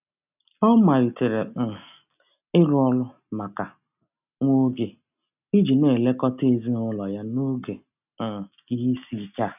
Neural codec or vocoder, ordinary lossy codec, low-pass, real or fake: none; none; 3.6 kHz; real